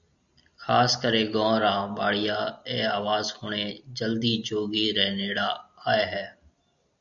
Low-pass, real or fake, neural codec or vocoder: 7.2 kHz; real; none